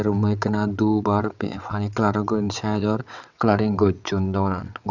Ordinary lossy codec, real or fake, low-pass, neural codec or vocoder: none; fake; 7.2 kHz; vocoder, 22.05 kHz, 80 mel bands, WaveNeXt